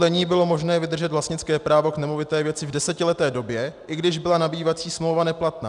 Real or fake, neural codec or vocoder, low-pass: real; none; 10.8 kHz